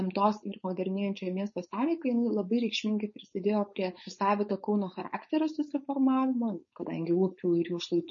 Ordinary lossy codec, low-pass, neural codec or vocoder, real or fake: MP3, 32 kbps; 7.2 kHz; codec, 16 kHz, 4.8 kbps, FACodec; fake